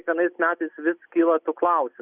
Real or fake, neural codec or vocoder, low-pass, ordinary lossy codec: real; none; 3.6 kHz; Opus, 64 kbps